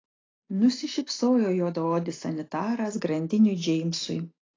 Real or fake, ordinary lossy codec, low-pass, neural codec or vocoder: real; AAC, 32 kbps; 7.2 kHz; none